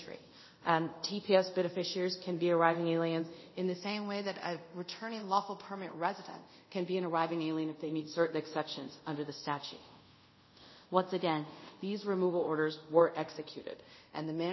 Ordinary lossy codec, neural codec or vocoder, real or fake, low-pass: MP3, 24 kbps; codec, 24 kHz, 0.5 kbps, DualCodec; fake; 7.2 kHz